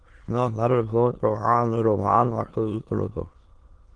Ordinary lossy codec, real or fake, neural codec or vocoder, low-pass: Opus, 16 kbps; fake; autoencoder, 22.05 kHz, a latent of 192 numbers a frame, VITS, trained on many speakers; 9.9 kHz